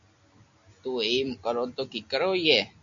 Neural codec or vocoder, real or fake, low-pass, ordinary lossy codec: none; real; 7.2 kHz; MP3, 64 kbps